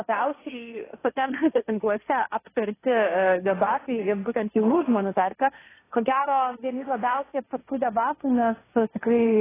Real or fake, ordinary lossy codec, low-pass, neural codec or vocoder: fake; AAC, 16 kbps; 3.6 kHz; codec, 16 kHz, 1.1 kbps, Voila-Tokenizer